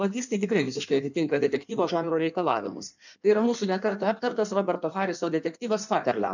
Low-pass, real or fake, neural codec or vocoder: 7.2 kHz; fake; codec, 16 kHz in and 24 kHz out, 1.1 kbps, FireRedTTS-2 codec